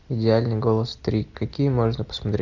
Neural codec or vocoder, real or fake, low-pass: none; real; 7.2 kHz